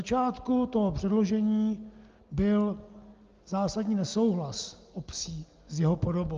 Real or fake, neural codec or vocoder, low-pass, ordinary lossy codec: real; none; 7.2 kHz; Opus, 32 kbps